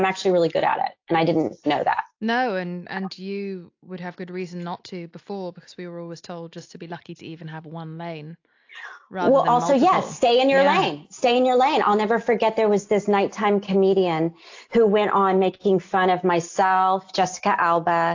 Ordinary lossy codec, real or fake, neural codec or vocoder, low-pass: AAC, 48 kbps; real; none; 7.2 kHz